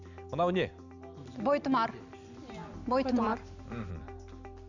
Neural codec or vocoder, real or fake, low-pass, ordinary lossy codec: none; real; 7.2 kHz; none